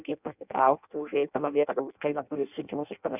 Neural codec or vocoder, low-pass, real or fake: codec, 16 kHz in and 24 kHz out, 0.6 kbps, FireRedTTS-2 codec; 3.6 kHz; fake